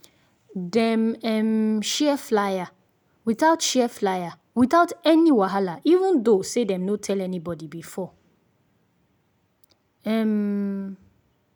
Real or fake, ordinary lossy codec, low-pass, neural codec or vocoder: real; none; none; none